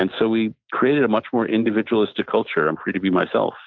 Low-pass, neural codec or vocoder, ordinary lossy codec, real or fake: 7.2 kHz; none; MP3, 64 kbps; real